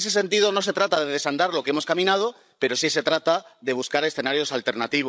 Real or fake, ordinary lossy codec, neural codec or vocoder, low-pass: fake; none; codec, 16 kHz, 16 kbps, FreqCodec, larger model; none